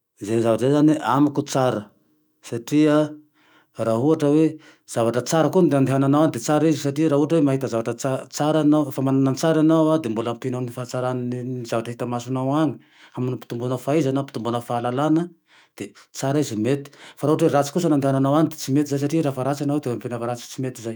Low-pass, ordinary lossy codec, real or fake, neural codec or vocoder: none; none; fake; autoencoder, 48 kHz, 128 numbers a frame, DAC-VAE, trained on Japanese speech